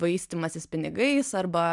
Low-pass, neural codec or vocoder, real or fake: 10.8 kHz; none; real